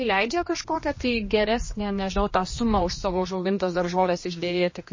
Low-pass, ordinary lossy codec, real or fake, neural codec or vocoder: 7.2 kHz; MP3, 32 kbps; fake; codec, 16 kHz, 2 kbps, X-Codec, HuBERT features, trained on general audio